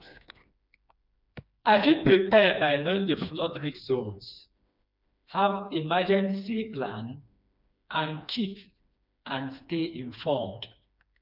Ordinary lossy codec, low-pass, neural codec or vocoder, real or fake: none; 5.4 kHz; codec, 16 kHz, 2 kbps, FreqCodec, smaller model; fake